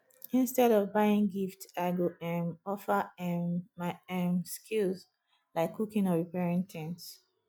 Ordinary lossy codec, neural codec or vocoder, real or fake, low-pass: none; none; real; none